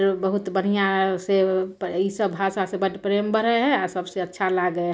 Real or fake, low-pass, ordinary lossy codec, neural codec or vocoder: real; none; none; none